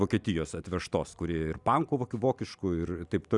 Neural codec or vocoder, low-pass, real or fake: none; 10.8 kHz; real